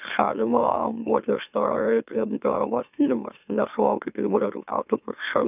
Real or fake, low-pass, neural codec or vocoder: fake; 3.6 kHz; autoencoder, 44.1 kHz, a latent of 192 numbers a frame, MeloTTS